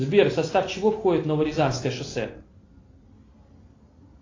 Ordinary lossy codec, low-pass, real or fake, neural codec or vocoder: AAC, 32 kbps; 7.2 kHz; real; none